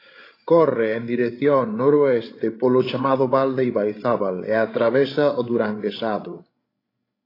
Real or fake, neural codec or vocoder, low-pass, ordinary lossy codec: fake; codec, 16 kHz, 16 kbps, FreqCodec, larger model; 5.4 kHz; AAC, 24 kbps